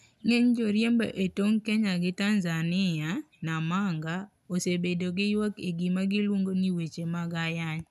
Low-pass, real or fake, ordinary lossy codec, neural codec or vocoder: 14.4 kHz; real; none; none